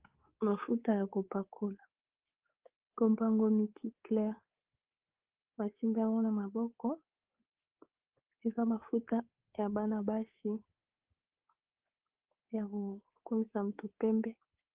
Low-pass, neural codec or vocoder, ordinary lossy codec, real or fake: 3.6 kHz; codec, 24 kHz, 3.1 kbps, DualCodec; Opus, 16 kbps; fake